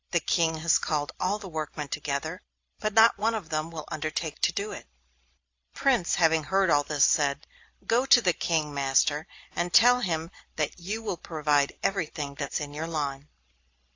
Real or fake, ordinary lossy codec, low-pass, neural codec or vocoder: real; AAC, 48 kbps; 7.2 kHz; none